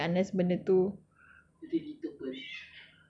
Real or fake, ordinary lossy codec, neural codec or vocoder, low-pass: real; none; none; 9.9 kHz